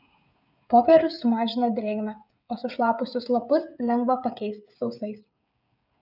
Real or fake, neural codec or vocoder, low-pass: fake; codec, 16 kHz, 16 kbps, FreqCodec, smaller model; 5.4 kHz